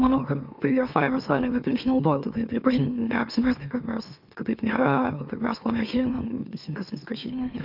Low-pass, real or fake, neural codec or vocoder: 5.4 kHz; fake; autoencoder, 44.1 kHz, a latent of 192 numbers a frame, MeloTTS